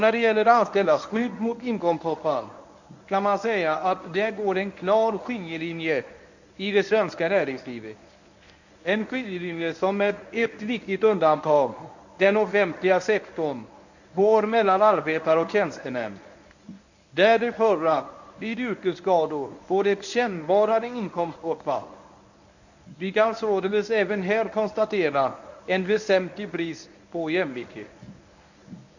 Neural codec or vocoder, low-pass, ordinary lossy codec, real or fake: codec, 24 kHz, 0.9 kbps, WavTokenizer, medium speech release version 1; 7.2 kHz; none; fake